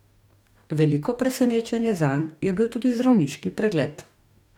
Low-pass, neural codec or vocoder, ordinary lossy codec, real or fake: 19.8 kHz; codec, 44.1 kHz, 2.6 kbps, DAC; none; fake